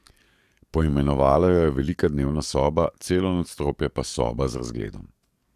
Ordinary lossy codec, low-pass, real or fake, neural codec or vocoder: Opus, 64 kbps; 14.4 kHz; fake; codec, 44.1 kHz, 7.8 kbps, DAC